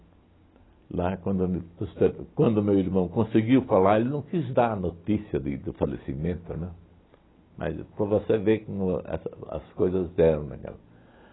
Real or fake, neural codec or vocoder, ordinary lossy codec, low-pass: real; none; AAC, 16 kbps; 7.2 kHz